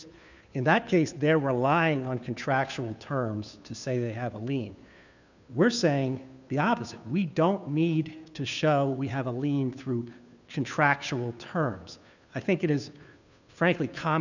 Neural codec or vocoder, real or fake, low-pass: codec, 16 kHz, 2 kbps, FunCodec, trained on Chinese and English, 25 frames a second; fake; 7.2 kHz